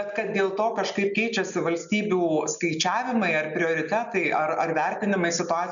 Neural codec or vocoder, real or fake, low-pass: none; real; 7.2 kHz